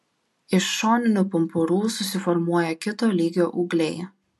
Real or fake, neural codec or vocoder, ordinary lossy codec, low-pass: real; none; MP3, 64 kbps; 14.4 kHz